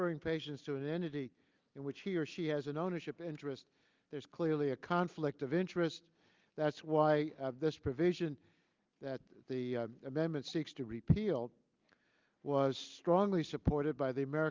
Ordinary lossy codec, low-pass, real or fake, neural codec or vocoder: Opus, 24 kbps; 7.2 kHz; real; none